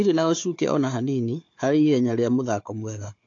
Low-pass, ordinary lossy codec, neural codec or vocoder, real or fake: 7.2 kHz; AAC, 48 kbps; codec, 16 kHz, 4 kbps, FunCodec, trained on Chinese and English, 50 frames a second; fake